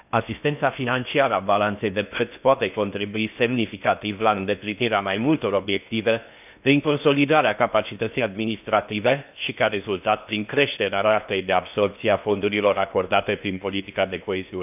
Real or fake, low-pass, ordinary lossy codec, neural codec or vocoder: fake; 3.6 kHz; none; codec, 16 kHz in and 24 kHz out, 0.8 kbps, FocalCodec, streaming, 65536 codes